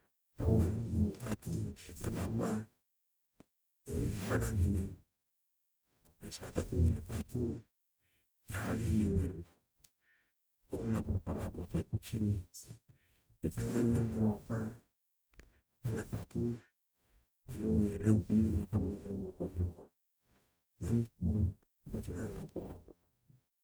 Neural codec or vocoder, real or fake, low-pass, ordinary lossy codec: codec, 44.1 kHz, 0.9 kbps, DAC; fake; none; none